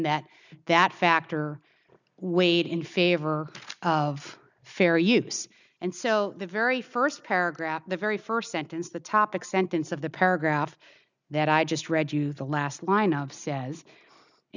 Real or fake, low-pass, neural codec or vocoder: real; 7.2 kHz; none